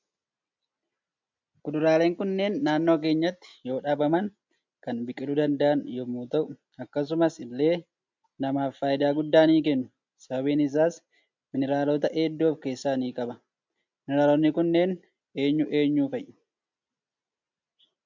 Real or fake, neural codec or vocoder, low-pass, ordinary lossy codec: real; none; 7.2 kHz; MP3, 64 kbps